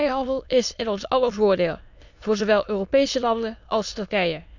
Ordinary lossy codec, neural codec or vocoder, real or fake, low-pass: none; autoencoder, 22.05 kHz, a latent of 192 numbers a frame, VITS, trained on many speakers; fake; 7.2 kHz